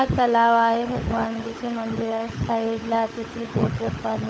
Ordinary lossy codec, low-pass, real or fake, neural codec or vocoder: none; none; fake; codec, 16 kHz, 16 kbps, FunCodec, trained on LibriTTS, 50 frames a second